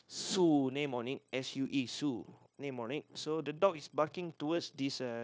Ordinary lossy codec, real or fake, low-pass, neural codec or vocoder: none; fake; none; codec, 16 kHz, 0.9 kbps, LongCat-Audio-Codec